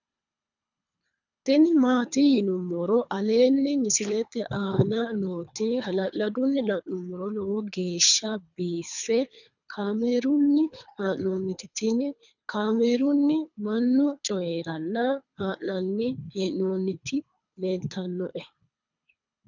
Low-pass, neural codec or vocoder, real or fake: 7.2 kHz; codec, 24 kHz, 3 kbps, HILCodec; fake